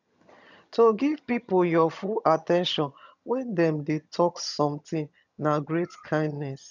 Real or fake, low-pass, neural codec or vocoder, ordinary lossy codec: fake; 7.2 kHz; vocoder, 22.05 kHz, 80 mel bands, HiFi-GAN; none